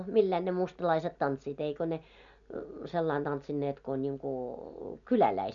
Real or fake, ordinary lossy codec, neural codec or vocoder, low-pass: real; none; none; 7.2 kHz